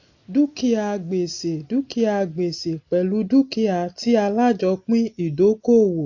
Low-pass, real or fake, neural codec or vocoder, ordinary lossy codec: 7.2 kHz; real; none; AAC, 48 kbps